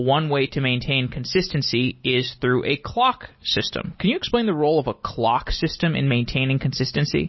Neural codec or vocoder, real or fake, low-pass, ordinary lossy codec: none; real; 7.2 kHz; MP3, 24 kbps